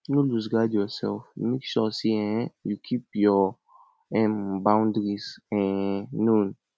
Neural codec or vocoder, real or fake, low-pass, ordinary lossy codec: none; real; none; none